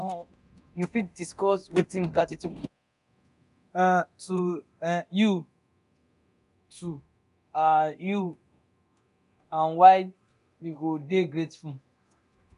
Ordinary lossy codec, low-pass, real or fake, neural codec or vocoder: none; 10.8 kHz; fake; codec, 24 kHz, 0.9 kbps, DualCodec